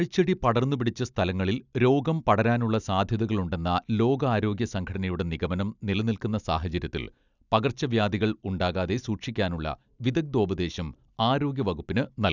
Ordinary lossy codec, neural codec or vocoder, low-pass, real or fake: none; none; 7.2 kHz; real